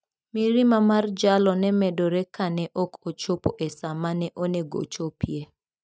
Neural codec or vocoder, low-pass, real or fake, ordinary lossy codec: none; none; real; none